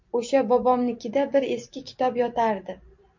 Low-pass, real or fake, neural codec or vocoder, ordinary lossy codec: 7.2 kHz; fake; vocoder, 44.1 kHz, 128 mel bands every 256 samples, BigVGAN v2; MP3, 48 kbps